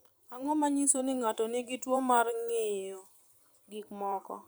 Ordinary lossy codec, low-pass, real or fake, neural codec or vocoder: none; none; fake; vocoder, 44.1 kHz, 128 mel bands every 512 samples, BigVGAN v2